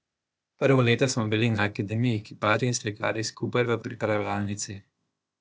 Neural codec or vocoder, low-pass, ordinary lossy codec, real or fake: codec, 16 kHz, 0.8 kbps, ZipCodec; none; none; fake